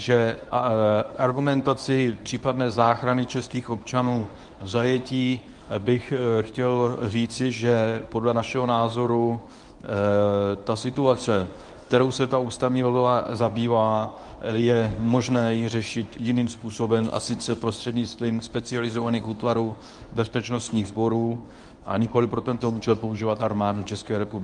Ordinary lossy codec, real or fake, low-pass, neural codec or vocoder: Opus, 32 kbps; fake; 10.8 kHz; codec, 24 kHz, 0.9 kbps, WavTokenizer, medium speech release version 1